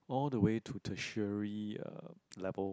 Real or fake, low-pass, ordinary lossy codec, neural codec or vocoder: real; none; none; none